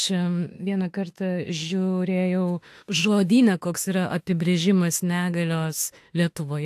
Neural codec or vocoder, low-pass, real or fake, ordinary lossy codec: autoencoder, 48 kHz, 32 numbers a frame, DAC-VAE, trained on Japanese speech; 14.4 kHz; fake; AAC, 64 kbps